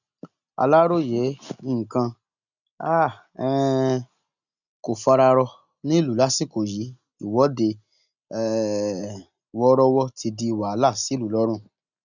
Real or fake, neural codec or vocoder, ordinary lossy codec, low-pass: real; none; none; 7.2 kHz